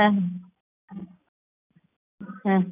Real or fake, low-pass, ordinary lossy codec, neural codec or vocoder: real; 3.6 kHz; none; none